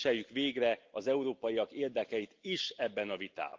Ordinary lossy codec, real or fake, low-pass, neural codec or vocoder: Opus, 32 kbps; real; 7.2 kHz; none